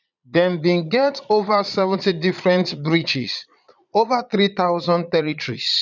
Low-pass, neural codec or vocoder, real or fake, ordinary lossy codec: 7.2 kHz; vocoder, 22.05 kHz, 80 mel bands, Vocos; fake; none